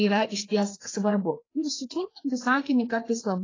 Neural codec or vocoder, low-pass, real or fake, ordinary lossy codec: codec, 16 kHz, 2 kbps, FreqCodec, larger model; 7.2 kHz; fake; AAC, 32 kbps